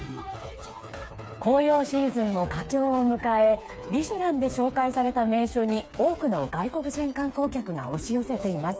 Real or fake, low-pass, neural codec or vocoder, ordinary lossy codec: fake; none; codec, 16 kHz, 4 kbps, FreqCodec, smaller model; none